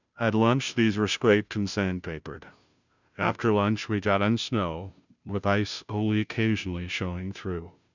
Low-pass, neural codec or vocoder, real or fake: 7.2 kHz; codec, 16 kHz, 0.5 kbps, FunCodec, trained on Chinese and English, 25 frames a second; fake